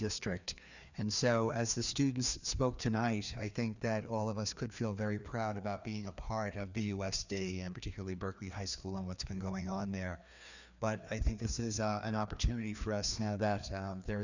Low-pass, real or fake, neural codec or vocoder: 7.2 kHz; fake; codec, 16 kHz, 2 kbps, FreqCodec, larger model